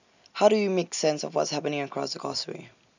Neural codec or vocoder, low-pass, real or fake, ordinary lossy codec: none; 7.2 kHz; real; none